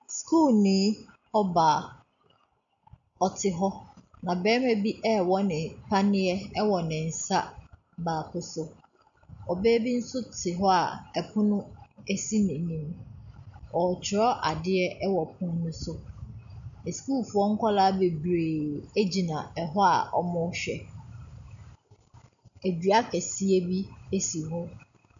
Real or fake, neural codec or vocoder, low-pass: real; none; 7.2 kHz